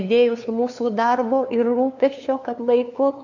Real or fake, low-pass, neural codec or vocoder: fake; 7.2 kHz; codec, 16 kHz, 2 kbps, FunCodec, trained on LibriTTS, 25 frames a second